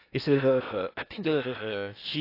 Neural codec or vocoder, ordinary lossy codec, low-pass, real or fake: codec, 16 kHz in and 24 kHz out, 0.8 kbps, FocalCodec, streaming, 65536 codes; AAC, 32 kbps; 5.4 kHz; fake